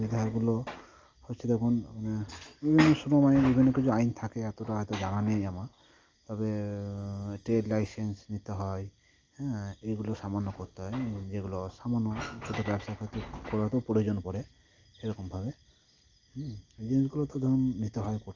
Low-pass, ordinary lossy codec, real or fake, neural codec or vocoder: 7.2 kHz; Opus, 24 kbps; real; none